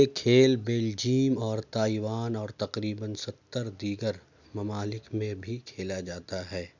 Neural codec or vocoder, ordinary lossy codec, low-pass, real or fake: none; none; 7.2 kHz; real